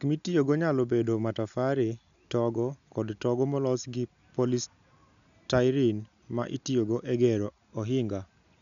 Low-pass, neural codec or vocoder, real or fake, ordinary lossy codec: 7.2 kHz; none; real; none